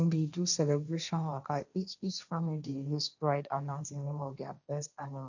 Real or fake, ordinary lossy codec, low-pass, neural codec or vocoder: fake; none; 7.2 kHz; codec, 16 kHz, 1.1 kbps, Voila-Tokenizer